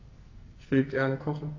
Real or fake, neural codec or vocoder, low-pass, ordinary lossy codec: fake; codec, 44.1 kHz, 2.6 kbps, SNAC; 7.2 kHz; AAC, 32 kbps